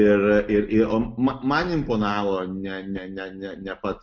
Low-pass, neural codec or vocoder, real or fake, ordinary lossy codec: 7.2 kHz; none; real; MP3, 64 kbps